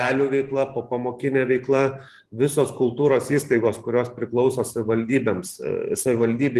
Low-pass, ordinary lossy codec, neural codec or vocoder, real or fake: 14.4 kHz; Opus, 24 kbps; vocoder, 44.1 kHz, 128 mel bands every 512 samples, BigVGAN v2; fake